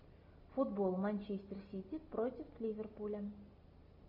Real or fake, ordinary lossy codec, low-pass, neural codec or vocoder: real; AAC, 48 kbps; 5.4 kHz; none